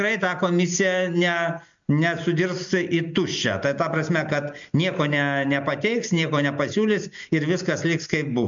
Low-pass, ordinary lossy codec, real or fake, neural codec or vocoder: 7.2 kHz; MP3, 64 kbps; real; none